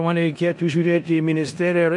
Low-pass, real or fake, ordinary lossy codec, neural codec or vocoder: 10.8 kHz; fake; MP3, 48 kbps; codec, 16 kHz in and 24 kHz out, 0.9 kbps, LongCat-Audio-Codec, four codebook decoder